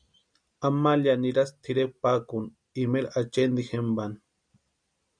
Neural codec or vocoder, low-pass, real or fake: none; 9.9 kHz; real